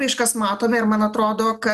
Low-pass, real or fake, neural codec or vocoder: 14.4 kHz; real; none